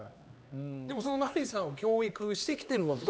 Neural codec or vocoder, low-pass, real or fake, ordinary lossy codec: codec, 16 kHz, 2 kbps, X-Codec, HuBERT features, trained on LibriSpeech; none; fake; none